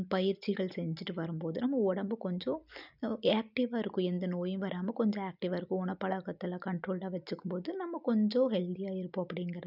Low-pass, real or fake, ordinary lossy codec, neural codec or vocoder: 5.4 kHz; real; none; none